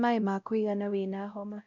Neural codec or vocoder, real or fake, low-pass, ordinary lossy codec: codec, 16 kHz, 0.5 kbps, X-Codec, WavLM features, trained on Multilingual LibriSpeech; fake; 7.2 kHz; none